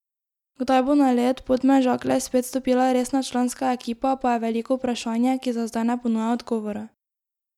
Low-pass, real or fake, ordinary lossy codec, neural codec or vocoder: 19.8 kHz; real; none; none